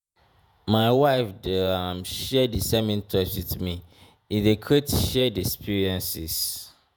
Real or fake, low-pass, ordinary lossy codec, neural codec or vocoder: fake; none; none; vocoder, 48 kHz, 128 mel bands, Vocos